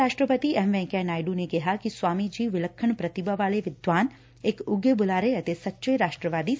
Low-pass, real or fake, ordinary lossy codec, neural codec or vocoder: none; real; none; none